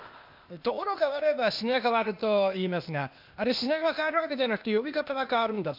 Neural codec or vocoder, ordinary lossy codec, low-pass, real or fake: codec, 16 kHz, 0.8 kbps, ZipCodec; MP3, 48 kbps; 5.4 kHz; fake